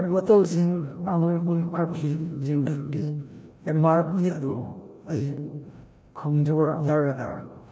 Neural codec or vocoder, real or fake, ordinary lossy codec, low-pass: codec, 16 kHz, 0.5 kbps, FreqCodec, larger model; fake; none; none